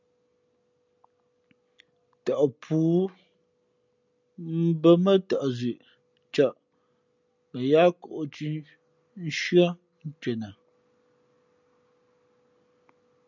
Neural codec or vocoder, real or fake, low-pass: none; real; 7.2 kHz